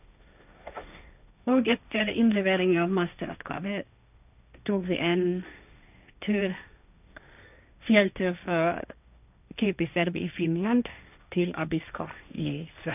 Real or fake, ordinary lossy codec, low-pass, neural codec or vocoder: fake; none; 3.6 kHz; codec, 16 kHz, 1.1 kbps, Voila-Tokenizer